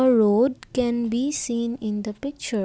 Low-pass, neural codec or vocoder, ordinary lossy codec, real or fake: none; none; none; real